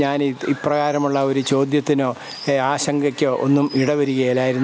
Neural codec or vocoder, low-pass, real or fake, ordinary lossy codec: none; none; real; none